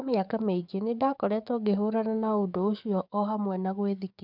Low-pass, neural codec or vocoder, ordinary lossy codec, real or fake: 5.4 kHz; codec, 44.1 kHz, 7.8 kbps, DAC; none; fake